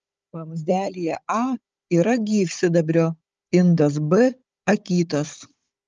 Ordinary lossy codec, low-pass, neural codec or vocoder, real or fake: Opus, 24 kbps; 7.2 kHz; codec, 16 kHz, 16 kbps, FunCodec, trained on Chinese and English, 50 frames a second; fake